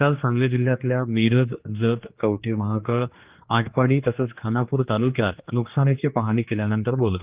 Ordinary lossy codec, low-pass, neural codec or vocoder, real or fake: Opus, 32 kbps; 3.6 kHz; codec, 16 kHz, 2 kbps, X-Codec, HuBERT features, trained on general audio; fake